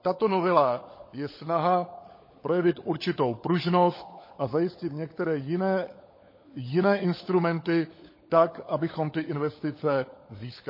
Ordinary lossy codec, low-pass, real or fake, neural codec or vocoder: MP3, 24 kbps; 5.4 kHz; fake; codec, 16 kHz, 16 kbps, FunCodec, trained on LibriTTS, 50 frames a second